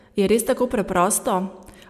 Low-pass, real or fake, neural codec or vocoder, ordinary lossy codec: 14.4 kHz; real; none; none